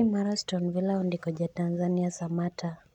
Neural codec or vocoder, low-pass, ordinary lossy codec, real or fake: none; 19.8 kHz; none; real